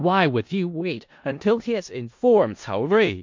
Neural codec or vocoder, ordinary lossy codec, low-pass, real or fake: codec, 16 kHz in and 24 kHz out, 0.4 kbps, LongCat-Audio-Codec, four codebook decoder; MP3, 48 kbps; 7.2 kHz; fake